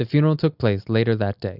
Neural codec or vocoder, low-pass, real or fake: none; 5.4 kHz; real